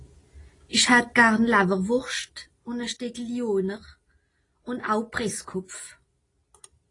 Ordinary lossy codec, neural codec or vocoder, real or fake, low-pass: AAC, 32 kbps; none; real; 10.8 kHz